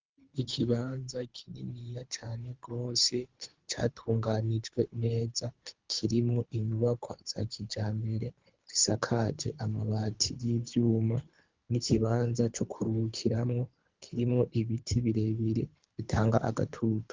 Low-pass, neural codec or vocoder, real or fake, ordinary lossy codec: 7.2 kHz; codec, 24 kHz, 3 kbps, HILCodec; fake; Opus, 24 kbps